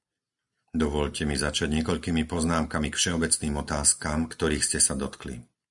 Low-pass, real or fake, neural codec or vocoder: 10.8 kHz; real; none